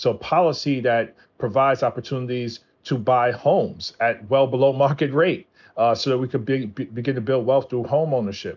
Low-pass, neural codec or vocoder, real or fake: 7.2 kHz; none; real